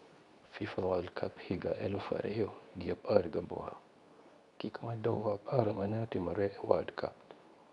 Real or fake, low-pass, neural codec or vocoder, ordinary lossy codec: fake; 10.8 kHz; codec, 24 kHz, 0.9 kbps, WavTokenizer, medium speech release version 2; none